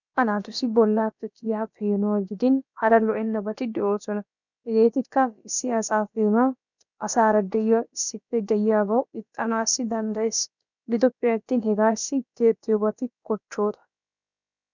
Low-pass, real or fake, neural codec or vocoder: 7.2 kHz; fake; codec, 16 kHz, about 1 kbps, DyCAST, with the encoder's durations